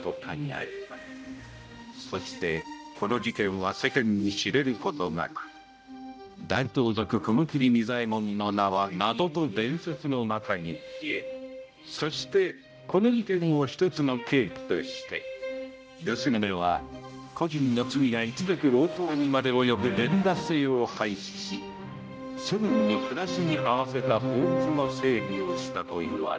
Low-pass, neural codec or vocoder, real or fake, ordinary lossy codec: none; codec, 16 kHz, 0.5 kbps, X-Codec, HuBERT features, trained on general audio; fake; none